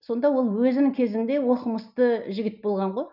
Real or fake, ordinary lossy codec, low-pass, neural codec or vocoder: real; none; 5.4 kHz; none